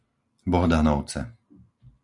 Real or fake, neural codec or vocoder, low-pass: real; none; 10.8 kHz